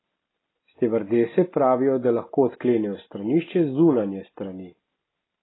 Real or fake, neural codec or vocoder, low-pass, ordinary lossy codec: real; none; 7.2 kHz; AAC, 16 kbps